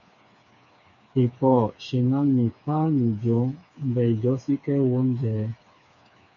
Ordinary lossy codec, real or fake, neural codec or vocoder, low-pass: MP3, 64 kbps; fake; codec, 16 kHz, 4 kbps, FreqCodec, smaller model; 7.2 kHz